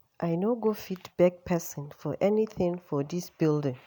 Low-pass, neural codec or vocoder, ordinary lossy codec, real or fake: none; none; none; real